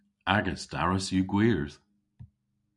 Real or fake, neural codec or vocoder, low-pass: real; none; 10.8 kHz